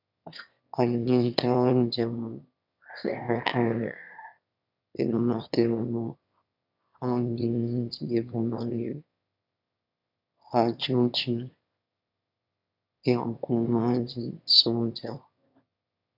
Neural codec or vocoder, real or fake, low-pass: autoencoder, 22.05 kHz, a latent of 192 numbers a frame, VITS, trained on one speaker; fake; 5.4 kHz